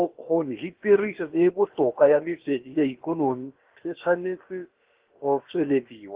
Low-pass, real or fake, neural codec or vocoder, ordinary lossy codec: 3.6 kHz; fake; codec, 16 kHz, about 1 kbps, DyCAST, with the encoder's durations; Opus, 16 kbps